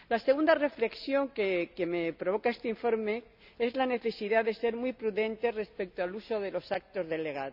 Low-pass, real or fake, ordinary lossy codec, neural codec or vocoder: 5.4 kHz; real; none; none